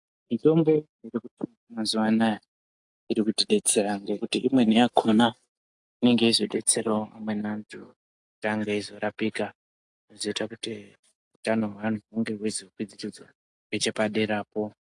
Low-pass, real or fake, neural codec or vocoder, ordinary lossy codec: 10.8 kHz; real; none; AAC, 64 kbps